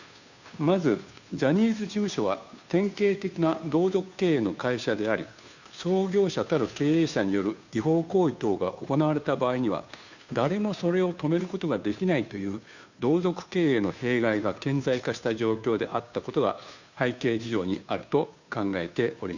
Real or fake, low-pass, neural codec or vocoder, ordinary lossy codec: fake; 7.2 kHz; codec, 16 kHz, 2 kbps, FunCodec, trained on Chinese and English, 25 frames a second; none